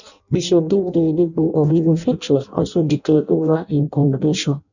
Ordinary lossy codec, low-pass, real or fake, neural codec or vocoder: none; 7.2 kHz; fake; codec, 16 kHz in and 24 kHz out, 0.6 kbps, FireRedTTS-2 codec